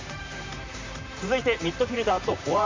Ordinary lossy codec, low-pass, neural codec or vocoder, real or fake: MP3, 64 kbps; 7.2 kHz; vocoder, 44.1 kHz, 128 mel bands, Pupu-Vocoder; fake